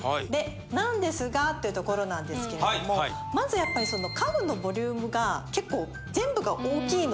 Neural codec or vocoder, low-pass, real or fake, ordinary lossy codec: none; none; real; none